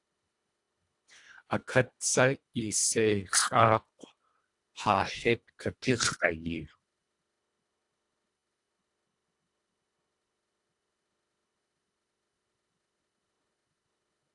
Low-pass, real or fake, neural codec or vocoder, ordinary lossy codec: 10.8 kHz; fake; codec, 24 kHz, 1.5 kbps, HILCodec; AAC, 64 kbps